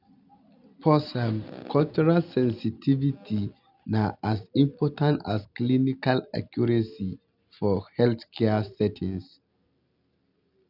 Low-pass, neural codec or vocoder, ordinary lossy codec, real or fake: 5.4 kHz; none; none; real